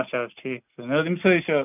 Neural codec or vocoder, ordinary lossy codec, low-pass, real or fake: none; none; 3.6 kHz; real